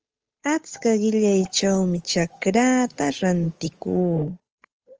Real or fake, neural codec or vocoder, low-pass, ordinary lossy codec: fake; codec, 16 kHz, 8 kbps, FunCodec, trained on Chinese and English, 25 frames a second; 7.2 kHz; Opus, 24 kbps